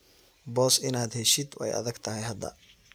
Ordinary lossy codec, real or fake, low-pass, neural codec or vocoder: none; real; none; none